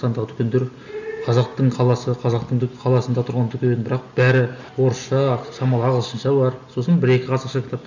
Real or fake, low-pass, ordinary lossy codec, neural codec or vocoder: real; 7.2 kHz; none; none